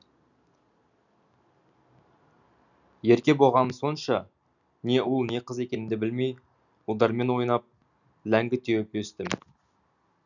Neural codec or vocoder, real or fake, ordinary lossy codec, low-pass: none; real; none; 7.2 kHz